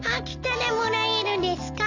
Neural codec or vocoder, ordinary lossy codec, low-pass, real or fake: none; none; 7.2 kHz; real